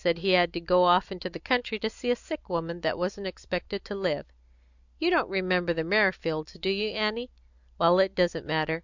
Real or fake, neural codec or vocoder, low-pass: real; none; 7.2 kHz